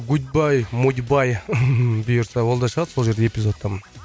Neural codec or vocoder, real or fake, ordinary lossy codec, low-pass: none; real; none; none